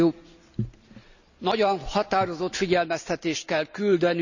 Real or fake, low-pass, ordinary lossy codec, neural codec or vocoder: real; 7.2 kHz; none; none